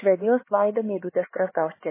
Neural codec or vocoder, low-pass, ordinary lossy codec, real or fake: codec, 16 kHz, 4 kbps, X-Codec, HuBERT features, trained on LibriSpeech; 3.6 kHz; MP3, 16 kbps; fake